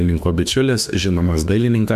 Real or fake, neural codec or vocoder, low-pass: fake; autoencoder, 48 kHz, 32 numbers a frame, DAC-VAE, trained on Japanese speech; 14.4 kHz